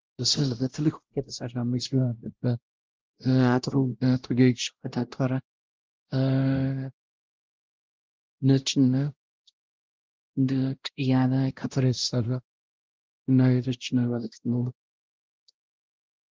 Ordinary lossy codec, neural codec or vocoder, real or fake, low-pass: Opus, 16 kbps; codec, 16 kHz, 0.5 kbps, X-Codec, WavLM features, trained on Multilingual LibriSpeech; fake; 7.2 kHz